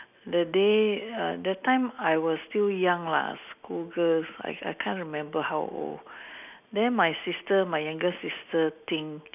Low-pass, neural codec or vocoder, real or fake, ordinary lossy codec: 3.6 kHz; none; real; none